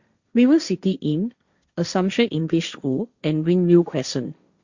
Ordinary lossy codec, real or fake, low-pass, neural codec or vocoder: Opus, 64 kbps; fake; 7.2 kHz; codec, 16 kHz, 1.1 kbps, Voila-Tokenizer